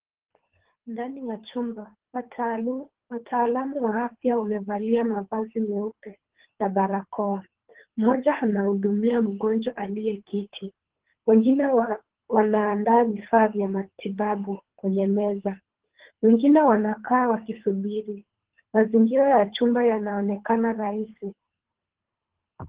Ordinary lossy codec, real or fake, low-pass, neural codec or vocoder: Opus, 16 kbps; fake; 3.6 kHz; codec, 24 kHz, 3 kbps, HILCodec